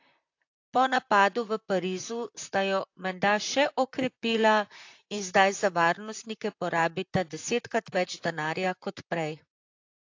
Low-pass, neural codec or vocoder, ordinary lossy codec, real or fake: 7.2 kHz; vocoder, 44.1 kHz, 128 mel bands, Pupu-Vocoder; AAC, 48 kbps; fake